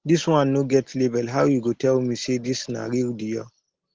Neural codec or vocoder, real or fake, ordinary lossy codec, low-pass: none; real; Opus, 16 kbps; 7.2 kHz